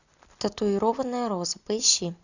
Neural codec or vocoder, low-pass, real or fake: none; 7.2 kHz; real